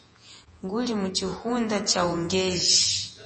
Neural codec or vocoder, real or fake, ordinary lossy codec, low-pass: vocoder, 48 kHz, 128 mel bands, Vocos; fake; MP3, 32 kbps; 10.8 kHz